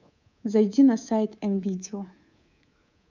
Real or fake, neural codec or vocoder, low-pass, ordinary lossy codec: fake; codec, 24 kHz, 3.1 kbps, DualCodec; 7.2 kHz; none